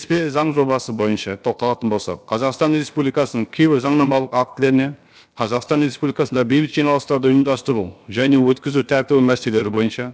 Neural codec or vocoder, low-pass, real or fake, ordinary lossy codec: codec, 16 kHz, about 1 kbps, DyCAST, with the encoder's durations; none; fake; none